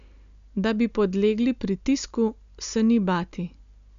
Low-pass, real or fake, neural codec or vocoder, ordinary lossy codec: 7.2 kHz; real; none; none